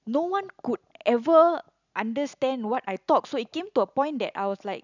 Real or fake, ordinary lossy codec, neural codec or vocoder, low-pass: real; none; none; 7.2 kHz